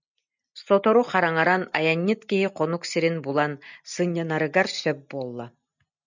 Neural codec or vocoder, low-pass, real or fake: none; 7.2 kHz; real